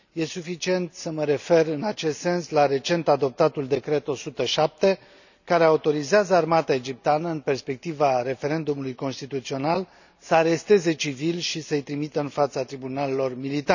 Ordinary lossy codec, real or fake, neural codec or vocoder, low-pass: none; real; none; 7.2 kHz